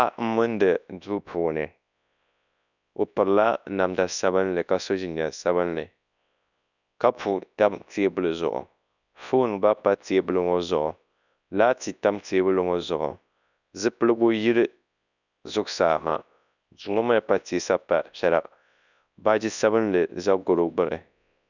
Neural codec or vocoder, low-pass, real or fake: codec, 24 kHz, 0.9 kbps, WavTokenizer, large speech release; 7.2 kHz; fake